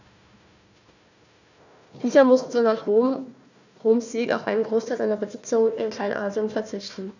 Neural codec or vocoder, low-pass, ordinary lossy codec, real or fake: codec, 16 kHz, 1 kbps, FunCodec, trained on Chinese and English, 50 frames a second; 7.2 kHz; none; fake